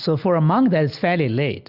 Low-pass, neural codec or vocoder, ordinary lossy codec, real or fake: 5.4 kHz; none; Opus, 64 kbps; real